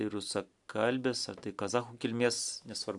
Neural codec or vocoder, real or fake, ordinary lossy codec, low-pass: none; real; AAC, 64 kbps; 10.8 kHz